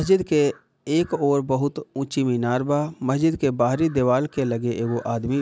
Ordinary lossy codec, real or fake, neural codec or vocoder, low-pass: none; real; none; none